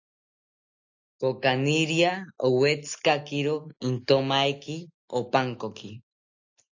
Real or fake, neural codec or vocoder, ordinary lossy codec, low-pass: real; none; AAC, 48 kbps; 7.2 kHz